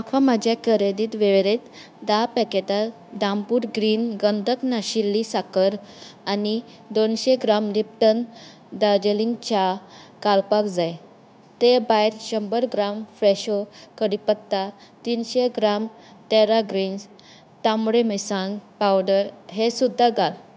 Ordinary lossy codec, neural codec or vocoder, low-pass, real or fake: none; codec, 16 kHz, 0.9 kbps, LongCat-Audio-Codec; none; fake